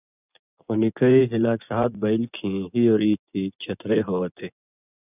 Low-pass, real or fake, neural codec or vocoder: 3.6 kHz; fake; vocoder, 24 kHz, 100 mel bands, Vocos